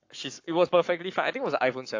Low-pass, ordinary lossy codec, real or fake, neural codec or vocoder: 7.2 kHz; AAC, 48 kbps; fake; codec, 44.1 kHz, 7.8 kbps, Pupu-Codec